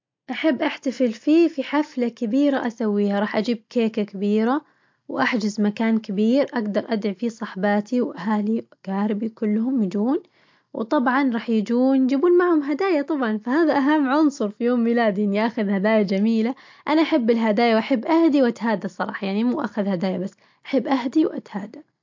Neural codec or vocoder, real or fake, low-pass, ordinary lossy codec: none; real; 7.2 kHz; MP3, 48 kbps